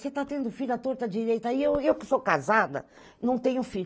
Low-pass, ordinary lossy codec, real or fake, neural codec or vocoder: none; none; real; none